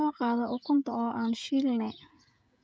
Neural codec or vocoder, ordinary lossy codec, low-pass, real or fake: codec, 16 kHz, 16 kbps, FreqCodec, smaller model; none; none; fake